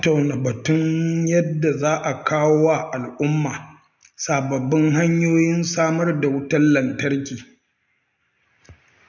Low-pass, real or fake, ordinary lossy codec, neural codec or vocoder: 7.2 kHz; real; none; none